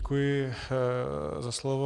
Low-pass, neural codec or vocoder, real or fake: 10.8 kHz; none; real